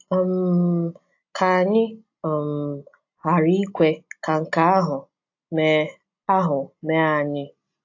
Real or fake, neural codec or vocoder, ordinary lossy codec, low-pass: real; none; AAC, 48 kbps; 7.2 kHz